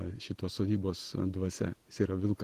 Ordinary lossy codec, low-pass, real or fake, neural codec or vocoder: Opus, 16 kbps; 14.4 kHz; fake; vocoder, 48 kHz, 128 mel bands, Vocos